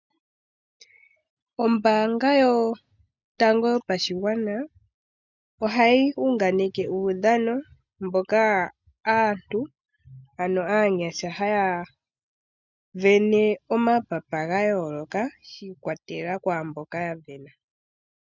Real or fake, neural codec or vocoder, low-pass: real; none; 7.2 kHz